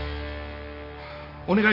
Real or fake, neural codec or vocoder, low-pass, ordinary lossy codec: real; none; 5.4 kHz; none